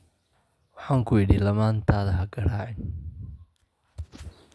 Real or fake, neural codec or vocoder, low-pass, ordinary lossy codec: real; none; none; none